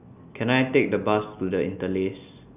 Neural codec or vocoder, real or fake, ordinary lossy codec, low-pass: none; real; none; 3.6 kHz